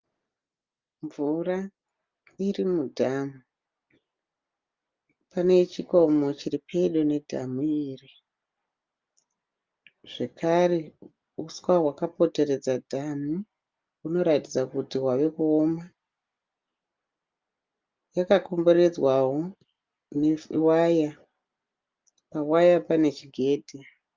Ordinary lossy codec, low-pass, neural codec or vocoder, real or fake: Opus, 32 kbps; 7.2 kHz; none; real